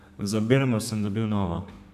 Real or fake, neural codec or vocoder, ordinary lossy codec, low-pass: fake; codec, 32 kHz, 1.9 kbps, SNAC; none; 14.4 kHz